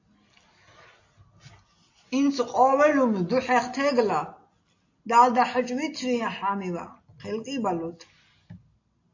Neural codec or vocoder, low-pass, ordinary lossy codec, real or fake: none; 7.2 kHz; AAC, 48 kbps; real